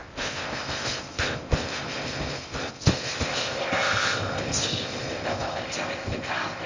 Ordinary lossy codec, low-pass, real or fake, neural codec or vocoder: MP3, 48 kbps; 7.2 kHz; fake; codec, 16 kHz in and 24 kHz out, 0.6 kbps, FocalCodec, streaming, 2048 codes